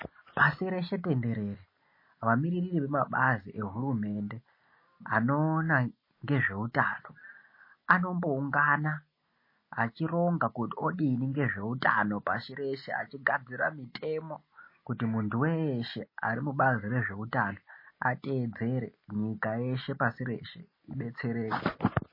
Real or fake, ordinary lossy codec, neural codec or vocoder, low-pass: real; MP3, 24 kbps; none; 5.4 kHz